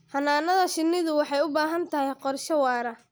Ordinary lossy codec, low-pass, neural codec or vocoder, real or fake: none; none; none; real